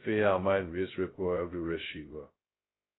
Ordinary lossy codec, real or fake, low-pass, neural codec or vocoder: AAC, 16 kbps; fake; 7.2 kHz; codec, 16 kHz, 0.2 kbps, FocalCodec